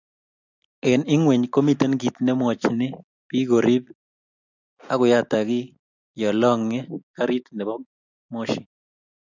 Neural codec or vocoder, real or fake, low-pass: none; real; 7.2 kHz